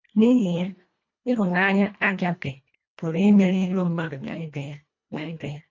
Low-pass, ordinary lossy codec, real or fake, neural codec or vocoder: 7.2 kHz; MP3, 48 kbps; fake; codec, 24 kHz, 1.5 kbps, HILCodec